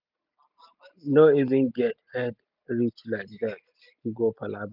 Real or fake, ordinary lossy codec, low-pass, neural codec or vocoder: real; none; 5.4 kHz; none